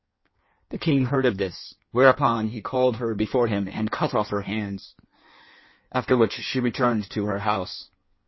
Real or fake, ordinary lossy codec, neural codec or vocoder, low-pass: fake; MP3, 24 kbps; codec, 16 kHz in and 24 kHz out, 1.1 kbps, FireRedTTS-2 codec; 7.2 kHz